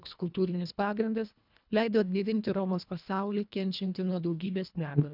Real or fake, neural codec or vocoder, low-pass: fake; codec, 24 kHz, 1.5 kbps, HILCodec; 5.4 kHz